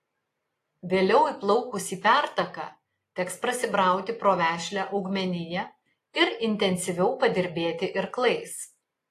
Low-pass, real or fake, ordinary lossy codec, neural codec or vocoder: 14.4 kHz; real; AAC, 48 kbps; none